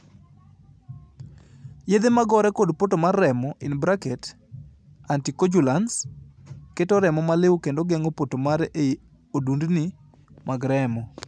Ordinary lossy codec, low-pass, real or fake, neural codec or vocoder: none; none; real; none